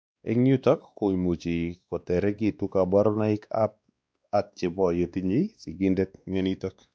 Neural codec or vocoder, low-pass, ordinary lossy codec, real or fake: codec, 16 kHz, 2 kbps, X-Codec, WavLM features, trained on Multilingual LibriSpeech; none; none; fake